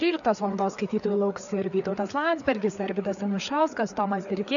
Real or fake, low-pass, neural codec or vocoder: fake; 7.2 kHz; codec, 16 kHz, 4 kbps, FreqCodec, larger model